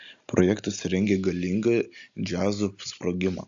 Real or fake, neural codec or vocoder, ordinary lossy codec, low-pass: real; none; AAC, 64 kbps; 7.2 kHz